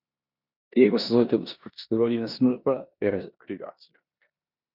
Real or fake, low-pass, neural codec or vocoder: fake; 5.4 kHz; codec, 16 kHz in and 24 kHz out, 0.9 kbps, LongCat-Audio-Codec, four codebook decoder